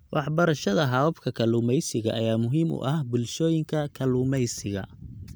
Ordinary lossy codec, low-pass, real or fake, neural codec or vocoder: none; none; real; none